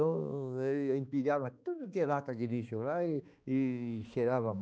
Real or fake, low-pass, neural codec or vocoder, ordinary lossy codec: fake; none; codec, 16 kHz, 2 kbps, X-Codec, HuBERT features, trained on balanced general audio; none